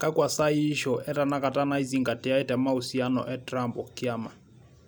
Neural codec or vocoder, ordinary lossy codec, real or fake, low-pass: none; none; real; none